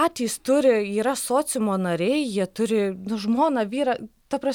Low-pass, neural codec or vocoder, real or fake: 19.8 kHz; none; real